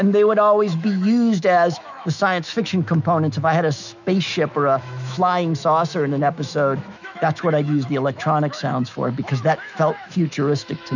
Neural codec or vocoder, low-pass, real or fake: autoencoder, 48 kHz, 128 numbers a frame, DAC-VAE, trained on Japanese speech; 7.2 kHz; fake